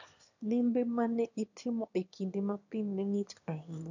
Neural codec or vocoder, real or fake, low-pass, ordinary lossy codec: autoencoder, 22.05 kHz, a latent of 192 numbers a frame, VITS, trained on one speaker; fake; 7.2 kHz; none